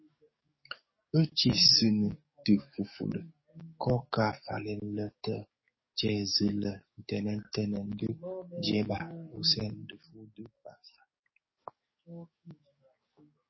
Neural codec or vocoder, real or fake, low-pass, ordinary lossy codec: codec, 44.1 kHz, 7.8 kbps, DAC; fake; 7.2 kHz; MP3, 24 kbps